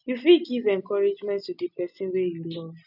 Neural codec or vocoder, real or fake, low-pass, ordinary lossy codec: none; real; 5.4 kHz; none